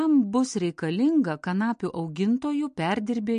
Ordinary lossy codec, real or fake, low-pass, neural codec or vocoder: MP3, 48 kbps; real; 9.9 kHz; none